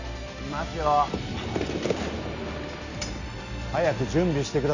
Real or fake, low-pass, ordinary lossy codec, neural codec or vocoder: real; 7.2 kHz; none; none